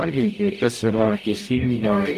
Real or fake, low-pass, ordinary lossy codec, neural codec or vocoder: fake; 14.4 kHz; Opus, 32 kbps; codec, 44.1 kHz, 0.9 kbps, DAC